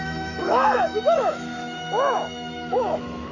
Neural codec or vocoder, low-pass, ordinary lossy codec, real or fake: autoencoder, 48 kHz, 128 numbers a frame, DAC-VAE, trained on Japanese speech; 7.2 kHz; none; fake